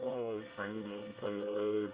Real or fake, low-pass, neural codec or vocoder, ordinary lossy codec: fake; 3.6 kHz; codec, 24 kHz, 1 kbps, SNAC; Opus, 32 kbps